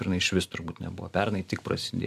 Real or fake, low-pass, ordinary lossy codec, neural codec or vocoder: real; 14.4 kHz; AAC, 96 kbps; none